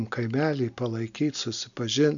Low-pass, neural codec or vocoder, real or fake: 7.2 kHz; none; real